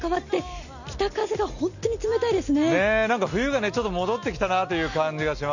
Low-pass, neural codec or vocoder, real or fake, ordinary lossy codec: 7.2 kHz; none; real; none